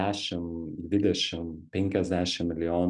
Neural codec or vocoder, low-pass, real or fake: none; 10.8 kHz; real